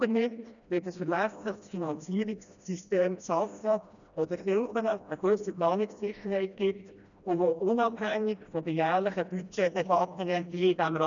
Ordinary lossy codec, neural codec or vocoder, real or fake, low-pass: none; codec, 16 kHz, 1 kbps, FreqCodec, smaller model; fake; 7.2 kHz